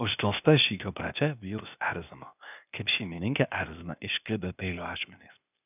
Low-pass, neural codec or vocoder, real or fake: 3.6 kHz; codec, 16 kHz, 0.8 kbps, ZipCodec; fake